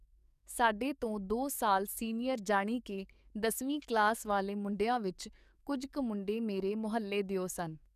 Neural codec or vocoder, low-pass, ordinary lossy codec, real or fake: codec, 44.1 kHz, 7.8 kbps, DAC; 14.4 kHz; none; fake